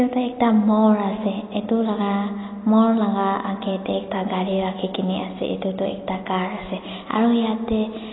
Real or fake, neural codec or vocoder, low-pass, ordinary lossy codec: real; none; 7.2 kHz; AAC, 16 kbps